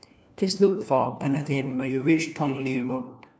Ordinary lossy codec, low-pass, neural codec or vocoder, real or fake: none; none; codec, 16 kHz, 1 kbps, FunCodec, trained on LibriTTS, 50 frames a second; fake